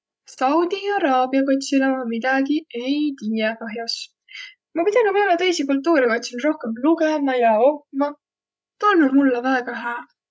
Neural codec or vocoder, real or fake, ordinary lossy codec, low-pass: codec, 16 kHz, 16 kbps, FreqCodec, larger model; fake; none; none